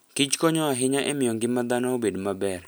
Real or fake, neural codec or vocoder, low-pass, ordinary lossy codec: real; none; none; none